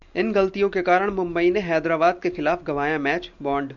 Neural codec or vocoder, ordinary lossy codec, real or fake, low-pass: none; MP3, 64 kbps; real; 7.2 kHz